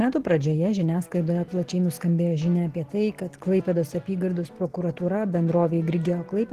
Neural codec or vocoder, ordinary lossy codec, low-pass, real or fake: none; Opus, 16 kbps; 14.4 kHz; real